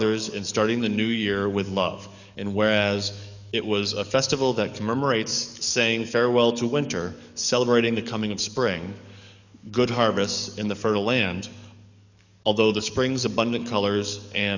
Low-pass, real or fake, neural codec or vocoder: 7.2 kHz; fake; codec, 44.1 kHz, 7.8 kbps, DAC